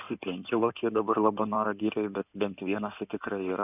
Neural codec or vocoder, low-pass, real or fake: codec, 16 kHz in and 24 kHz out, 2.2 kbps, FireRedTTS-2 codec; 3.6 kHz; fake